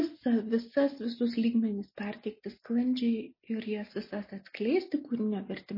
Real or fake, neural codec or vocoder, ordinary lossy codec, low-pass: real; none; MP3, 24 kbps; 5.4 kHz